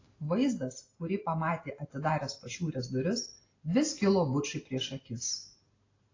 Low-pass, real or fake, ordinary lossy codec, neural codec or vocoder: 7.2 kHz; real; AAC, 32 kbps; none